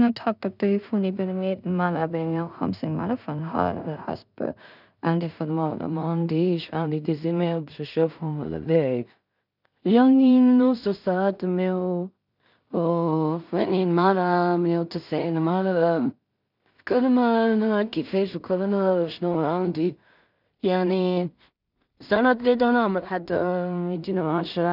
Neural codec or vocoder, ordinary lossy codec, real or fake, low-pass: codec, 16 kHz in and 24 kHz out, 0.4 kbps, LongCat-Audio-Codec, two codebook decoder; none; fake; 5.4 kHz